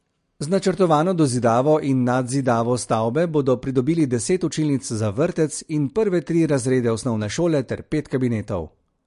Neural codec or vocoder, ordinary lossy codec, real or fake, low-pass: none; MP3, 48 kbps; real; 10.8 kHz